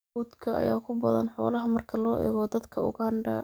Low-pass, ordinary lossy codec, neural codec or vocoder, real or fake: none; none; codec, 44.1 kHz, 7.8 kbps, DAC; fake